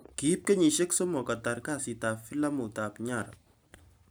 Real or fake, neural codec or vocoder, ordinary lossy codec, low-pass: real; none; none; none